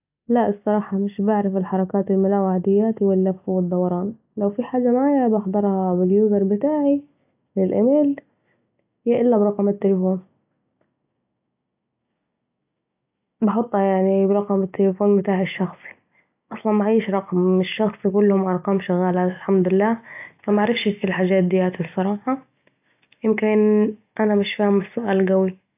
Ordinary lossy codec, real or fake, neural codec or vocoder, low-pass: AAC, 32 kbps; real; none; 3.6 kHz